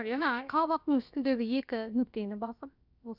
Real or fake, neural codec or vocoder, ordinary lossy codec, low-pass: fake; codec, 16 kHz, about 1 kbps, DyCAST, with the encoder's durations; none; 5.4 kHz